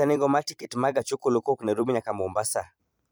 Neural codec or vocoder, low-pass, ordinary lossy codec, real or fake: vocoder, 44.1 kHz, 128 mel bands every 256 samples, BigVGAN v2; none; none; fake